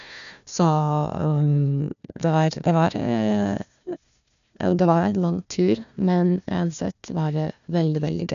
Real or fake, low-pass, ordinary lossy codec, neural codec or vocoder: fake; 7.2 kHz; none; codec, 16 kHz, 1 kbps, FunCodec, trained on Chinese and English, 50 frames a second